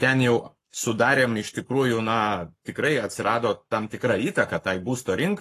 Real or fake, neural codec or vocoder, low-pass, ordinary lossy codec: fake; codec, 44.1 kHz, 7.8 kbps, Pupu-Codec; 14.4 kHz; AAC, 48 kbps